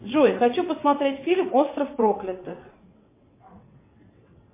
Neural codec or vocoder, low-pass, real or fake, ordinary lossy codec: vocoder, 22.05 kHz, 80 mel bands, WaveNeXt; 3.6 kHz; fake; MP3, 24 kbps